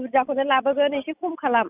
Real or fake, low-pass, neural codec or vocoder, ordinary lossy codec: real; 3.6 kHz; none; none